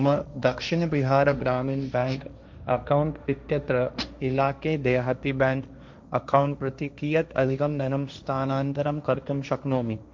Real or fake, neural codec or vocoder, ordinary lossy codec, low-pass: fake; codec, 16 kHz, 1.1 kbps, Voila-Tokenizer; none; none